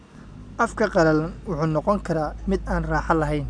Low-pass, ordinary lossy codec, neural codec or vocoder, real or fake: 9.9 kHz; none; none; real